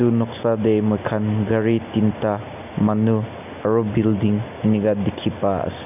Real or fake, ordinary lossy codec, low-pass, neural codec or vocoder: real; none; 3.6 kHz; none